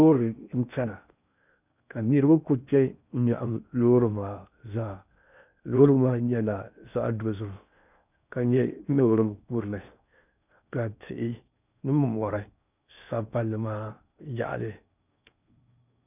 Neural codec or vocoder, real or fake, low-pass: codec, 16 kHz in and 24 kHz out, 0.6 kbps, FocalCodec, streaming, 4096 codes; fake; 3.6 kHz